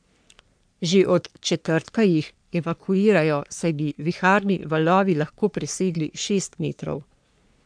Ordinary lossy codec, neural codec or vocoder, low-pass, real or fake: none; codec, 44.1 kHz, 3.4 kbps, Pupu-Codec; 9.9 kHz; fake